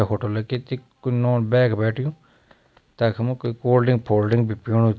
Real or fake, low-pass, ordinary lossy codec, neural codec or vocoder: real; none; none; none